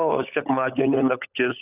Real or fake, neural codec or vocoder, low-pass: fake; codec, 16 kHz, 16 kbps, FunCodec, trained on LibriTTS, 50 frames a second; 3.6 kHz